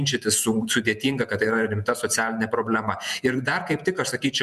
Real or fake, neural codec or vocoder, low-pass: fake; vocoder, 48 kHz, 128 mel bands, Vocos; 14.4 kHz